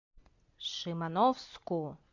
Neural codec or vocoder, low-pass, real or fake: none; 7.2 kHz; real